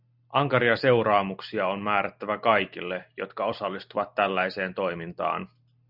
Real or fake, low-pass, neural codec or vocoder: real; 5.4 kHz; none